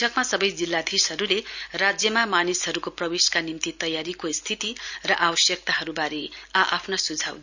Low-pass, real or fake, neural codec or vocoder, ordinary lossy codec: 7.2 kHz; real; none; none